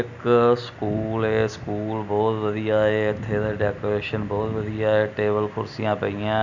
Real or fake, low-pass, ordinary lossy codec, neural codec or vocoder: real; 7.2 kHz; none; none